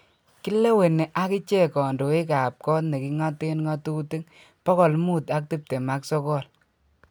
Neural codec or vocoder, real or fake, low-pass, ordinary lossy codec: none; real; none; none